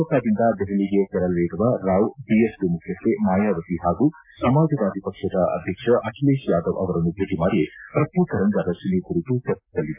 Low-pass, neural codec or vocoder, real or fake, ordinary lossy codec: 3.6 kHz; none; real; MP3, 24 kbps